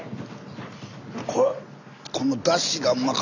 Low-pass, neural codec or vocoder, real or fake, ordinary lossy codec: 7.2 kHz; none; real; none